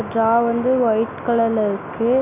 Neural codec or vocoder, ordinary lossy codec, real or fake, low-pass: none; none; real; 3.6 kHz